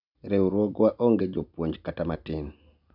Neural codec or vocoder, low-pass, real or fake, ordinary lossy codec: none; 5.4 kHz; real; none